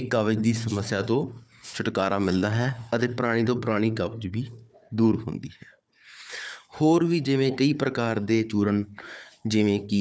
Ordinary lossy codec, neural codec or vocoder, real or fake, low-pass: none; codec, 16 kHz, 4 kbps, FunCodec, trained on Chinese and English, 50 frames a second; fake; none